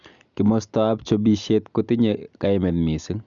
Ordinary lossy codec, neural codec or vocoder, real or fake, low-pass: none; none; real; 7.2 kHz